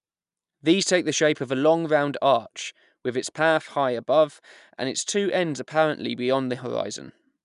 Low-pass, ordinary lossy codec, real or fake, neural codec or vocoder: 10.8 kHz; none; real; none